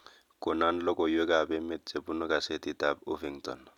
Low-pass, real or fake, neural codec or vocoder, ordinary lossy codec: 19.8 kHz; real; none; none